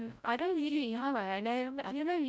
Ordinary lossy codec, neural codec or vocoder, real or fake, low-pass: none; codec, 16 kHz, 0.5 kbps, FreqCodec, larger model; fake; none